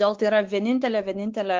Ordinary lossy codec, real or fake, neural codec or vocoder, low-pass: Opus, 16 kbps; fake; codec, 16 kHz, 4 kbps, X-Codec, WavLM features, trained on Multilingual LibriSpeech; 7.2 kHz